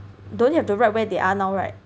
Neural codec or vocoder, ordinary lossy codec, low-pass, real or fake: none; none; none; real